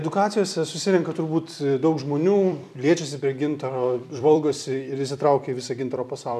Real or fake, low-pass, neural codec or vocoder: fake; 14.4 kHz; vocoder, 44.1 kHz, 128 mel bands every 256 samples, BigVGAN v2